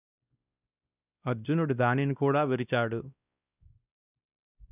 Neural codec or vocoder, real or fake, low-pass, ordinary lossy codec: codec, 16 kHz, 1 kbps, X-Codec, WavLM features, trained on Multilingual LibriSpeech; fake; 3.6 kHz; none